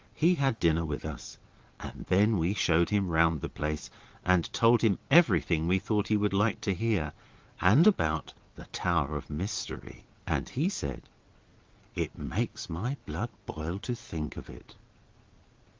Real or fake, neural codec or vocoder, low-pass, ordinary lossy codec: fake; vocoder, 22.05 kHz, 80 mel bands, Vocos; 7.2 kHz; Opus, 32 kbps